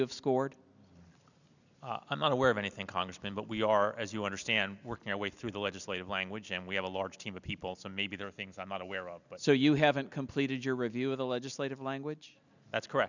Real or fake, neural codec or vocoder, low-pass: real; none; 7.2 kHz